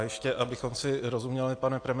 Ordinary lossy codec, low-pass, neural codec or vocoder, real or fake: AAC, 48 kbps; 9.9 kHz; none; real